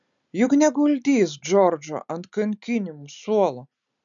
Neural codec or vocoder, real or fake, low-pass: none; real; 7.2 kHz